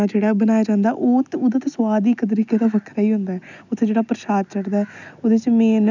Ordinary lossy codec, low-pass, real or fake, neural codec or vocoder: none; 7.2 kHz; real; none